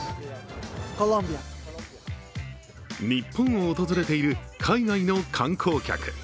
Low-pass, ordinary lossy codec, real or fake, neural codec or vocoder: none; none; real; none